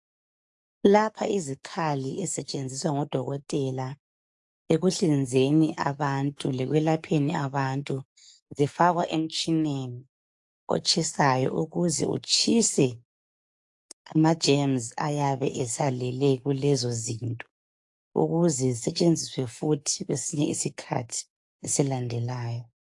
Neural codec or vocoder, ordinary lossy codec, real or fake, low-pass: codec, 44.1 kHz, 7.8 kbps, DAC; AAC, 64 kbps; fake; 10.8 kHz